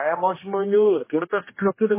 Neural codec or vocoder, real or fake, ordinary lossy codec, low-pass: codec, 16 kHz, 1 kbps, X-Codec, HuBERT features, trained on general audio; fake; MP3, 16 kbps; 3.6 kHz